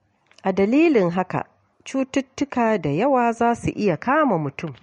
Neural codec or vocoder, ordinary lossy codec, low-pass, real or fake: none; MP3, 48 kbps; 19.8 kHz; real